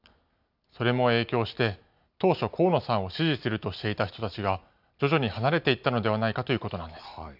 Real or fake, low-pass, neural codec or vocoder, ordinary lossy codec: real; 5.4 kHz; none; none